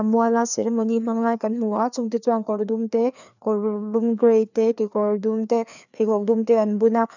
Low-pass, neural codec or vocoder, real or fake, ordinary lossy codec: 7.2 kHz; codec, 16 kHz, 2 kbps, FreqCodec, larger model; fake; none